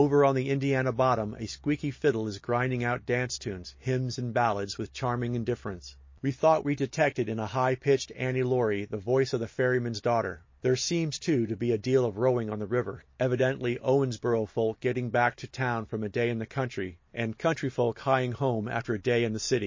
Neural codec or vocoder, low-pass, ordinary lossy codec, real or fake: none; 7.2 kHz; MP3, 32 kbps; real